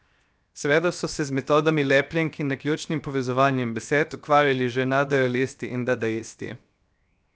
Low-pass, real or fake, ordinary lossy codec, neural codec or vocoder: none; fake; none; codec, 16 kHz, 0.7 kbps, FocalCodec